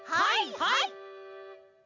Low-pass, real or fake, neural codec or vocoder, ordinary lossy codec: 7.2 kHz; real; none; none